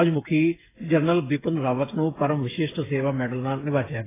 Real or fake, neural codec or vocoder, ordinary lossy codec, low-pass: fake; codec, 16 kHz, 8 kbps, FreqCodec, smaller model; AAC, 16 kbps; 3.6 kHz